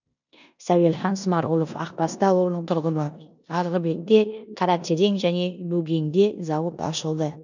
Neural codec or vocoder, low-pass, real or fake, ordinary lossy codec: codec, 16 kHz in and 24 kHz out, 0.9 kbps, LongCat-Audio-Codec, four codebook decoder; 7.2 kHz; fake; none